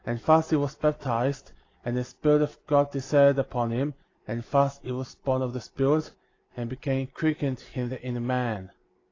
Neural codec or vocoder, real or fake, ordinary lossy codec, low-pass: none; real; AAC, 32 kbps; 7.2 kHz